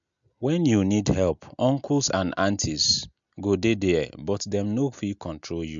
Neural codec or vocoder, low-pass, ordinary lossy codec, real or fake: none; 7.2 kHz; MP3, 64 kbps; real